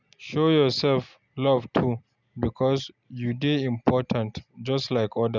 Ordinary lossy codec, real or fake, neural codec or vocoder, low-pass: none; real; none; 7.2 kHz